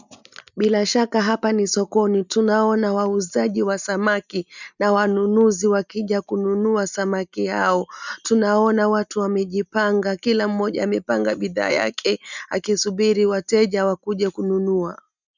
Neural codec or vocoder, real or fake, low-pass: none; real; 7.2 kHz